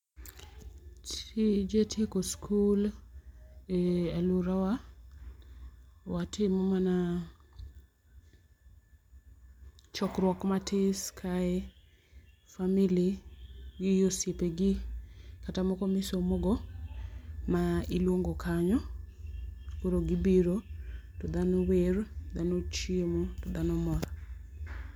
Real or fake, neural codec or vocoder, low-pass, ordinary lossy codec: real; none; 19.8 kHz; MP3, 96 kbps